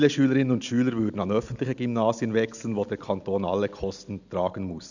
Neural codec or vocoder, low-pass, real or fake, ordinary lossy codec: none; 7.2 kHz; real; none